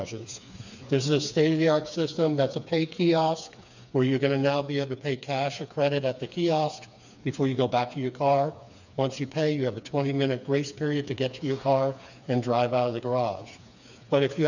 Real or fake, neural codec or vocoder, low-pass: fake; codec, 16 kHz, 4 kbps, FreqCodec, smaller model; 7.2 kHz